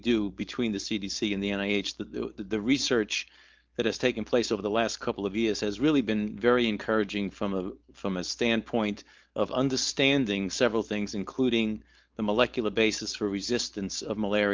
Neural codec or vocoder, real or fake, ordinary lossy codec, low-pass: codec, 16 kHz, 4.8 kbps, FACodec; fake; Opus, 32 kbps; 7.2 kHz